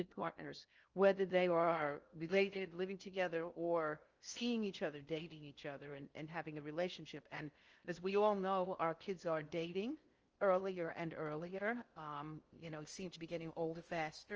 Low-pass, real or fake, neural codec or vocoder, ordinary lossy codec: 7.2 kHz; fake; codec, 16 kHz in and 24 kHz out, 0.6 kbps, FocalCodec, streaming, 2048 codes; Opus, 24 kbps